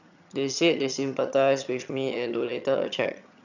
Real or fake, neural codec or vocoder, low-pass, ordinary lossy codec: fake; vocoder, 22.05 kHz, 80 mel bands, HiFi-GAN; 7.2 kHz; none